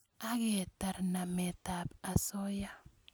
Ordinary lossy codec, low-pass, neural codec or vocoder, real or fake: none; none; none; real